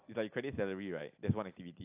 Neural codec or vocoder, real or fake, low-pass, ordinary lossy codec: none; real; 3.6 kHz; AAC, 32 kbps